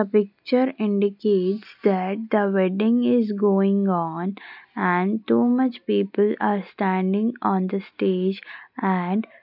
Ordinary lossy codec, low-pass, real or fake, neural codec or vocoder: none; 5.4 kHz; real; none